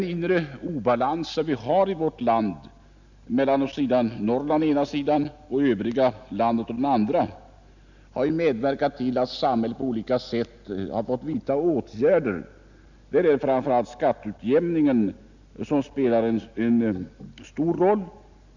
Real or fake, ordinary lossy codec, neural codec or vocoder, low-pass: real; none; none; 7.2 kHz